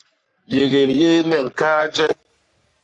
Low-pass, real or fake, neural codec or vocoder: 10.8 kHz; fake; codec, 44.1 kHz, 3.4 kbps, Pupu-Codec